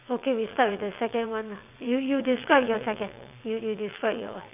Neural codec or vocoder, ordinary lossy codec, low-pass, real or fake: vocoder, 22.05 kHz, 80 mel bands, WaveNeXt; none; 3.6 kHz; fake